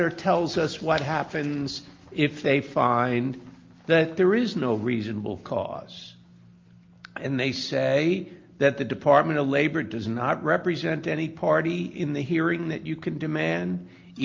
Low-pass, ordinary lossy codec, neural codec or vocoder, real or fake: 7.2 kHz; Opus, 32 kbps; none; real